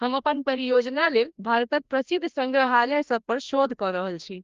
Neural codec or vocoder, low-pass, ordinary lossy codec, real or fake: codec, 16 kHz, 1 kbps, FreqCodec, larger model; 7.2 kHz; Opus, 32 kbps; fake